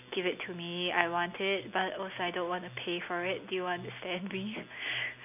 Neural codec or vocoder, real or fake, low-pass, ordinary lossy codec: none; real; 3.6 kHz; none